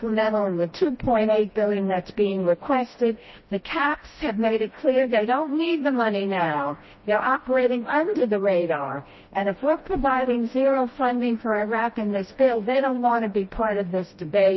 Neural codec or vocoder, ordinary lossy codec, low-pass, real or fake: codec, 16 kHz, 1 kbps, FreqCodec, smaller model; MP3, 24 kbps; 7.2 kHz; fake